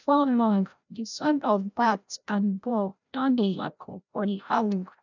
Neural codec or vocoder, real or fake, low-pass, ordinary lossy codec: codec, 16 kHz, 0.5 kbps, FreqCodec, larger model; fake; 7.2 kHz; none